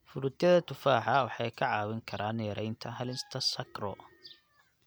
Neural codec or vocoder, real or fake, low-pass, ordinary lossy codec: none; real; none; none